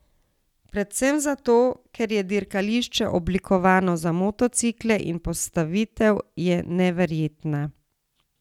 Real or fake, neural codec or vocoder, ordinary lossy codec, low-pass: real; none; none; 19.8 kHz